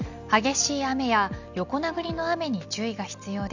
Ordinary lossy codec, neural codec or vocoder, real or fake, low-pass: none; none; real; 7.2 kHz